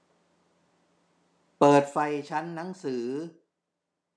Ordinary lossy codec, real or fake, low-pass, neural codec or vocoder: none; real; none; none